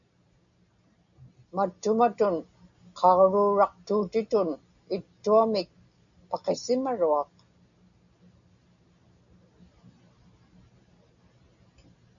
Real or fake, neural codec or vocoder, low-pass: real; none; 7.2 kHz